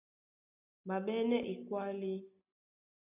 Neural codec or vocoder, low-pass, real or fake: none; 3.6 kHz; real